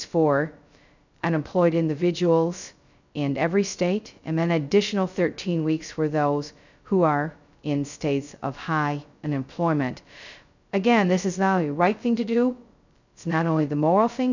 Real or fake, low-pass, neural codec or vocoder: fake; 7.2 kHz; codec, 16 kHz, 0.2 kbps, FocalCodec